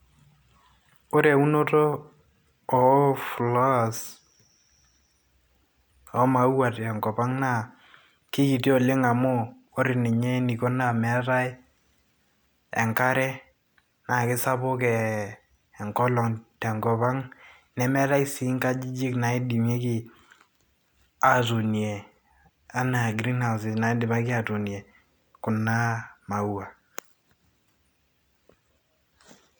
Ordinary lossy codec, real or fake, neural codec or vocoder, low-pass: none; real; none; none